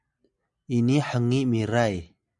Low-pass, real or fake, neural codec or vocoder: 10.8 kHz; real; none